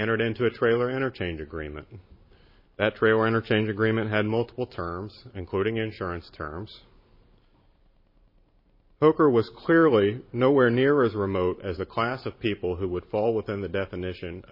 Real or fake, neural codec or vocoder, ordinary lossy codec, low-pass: fake; vocoder, 44.1 kHz, 128 mel bands every 512 samples, BigVGAN v2; MP3, 24 kbps; 5.4 kHz